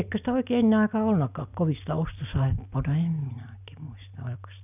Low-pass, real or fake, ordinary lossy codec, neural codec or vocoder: 3.6 kHz; real; none; none